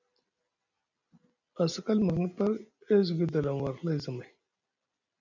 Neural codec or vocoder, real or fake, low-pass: none; real; 7.2 kHz